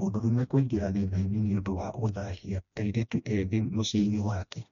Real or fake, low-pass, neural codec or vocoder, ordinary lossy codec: fake; 7.2 kHz; codec, 16 kHz, 1 kbps, FreqCodec, smaller model; MP3, 96 kbps